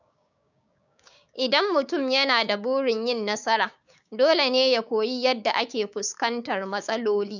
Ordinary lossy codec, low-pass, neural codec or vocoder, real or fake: none; 7.2 kHz; codec, 16 kHz, 6 kbps, DAC; fake